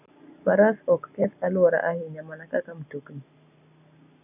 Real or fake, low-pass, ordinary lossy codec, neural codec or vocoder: real; 3.6 kHz; none; none